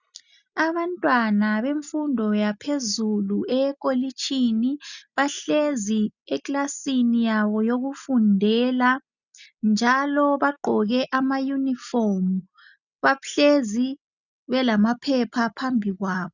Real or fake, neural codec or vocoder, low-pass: real; none; 7.2 kHz